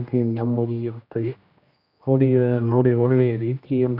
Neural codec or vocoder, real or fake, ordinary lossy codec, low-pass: codec, 16 kHz, 1 kbps, X-Codec, HuBERT features, trained on general audio; fake; none; 5.4 kHz